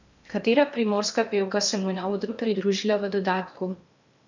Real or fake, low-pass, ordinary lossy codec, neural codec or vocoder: fake; 7.2 kHz; none; codec, 16 kHz in and 24 kHz out, 0.8 kbps, FocalCodec, streaming, 65536 codes